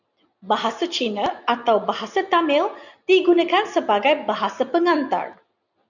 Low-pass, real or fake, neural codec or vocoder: 7.2 kHz; real; none